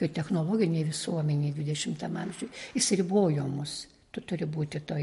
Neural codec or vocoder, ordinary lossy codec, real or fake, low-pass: none; MP3, 48 kbps; real; 14.4 kHz